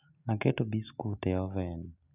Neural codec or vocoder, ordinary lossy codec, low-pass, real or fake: none; none; 3.6 kHz; real